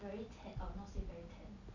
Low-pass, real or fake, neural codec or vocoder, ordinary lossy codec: 7.2 kHz; real; none; none